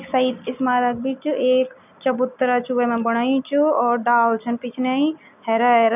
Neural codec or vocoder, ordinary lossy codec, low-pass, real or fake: none; none; 3.6 kHz; real